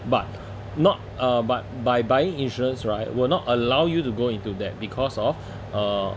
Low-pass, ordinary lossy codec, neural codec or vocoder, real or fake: none; none; none; real